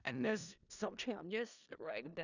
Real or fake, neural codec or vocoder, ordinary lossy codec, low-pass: fake; codec, 16 kHz in and 24 kHz out, 0.4 kbps, LongCat-Audio-Codec, four codebook decoder; none; 7.2 kHz